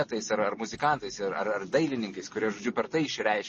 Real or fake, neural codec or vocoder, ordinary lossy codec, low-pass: real; none; MP3, 32 kbps; 10.8 kHz